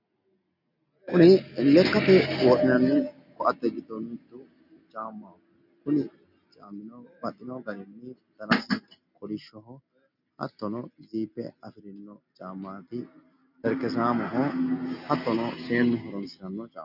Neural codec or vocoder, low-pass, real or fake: none; 5.4 kHz; real